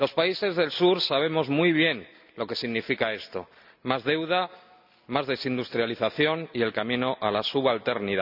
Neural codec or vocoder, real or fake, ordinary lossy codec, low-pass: none; real; none; 5.4 kHz